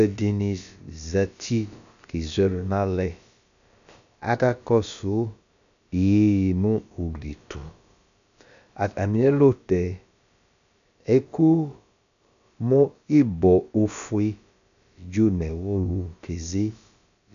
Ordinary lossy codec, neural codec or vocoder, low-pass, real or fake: MP3, 96 kbps; codec, 16 kHz, about 1 kbps, DyCAST, with the encoder's durations; 7.2 kHz; fake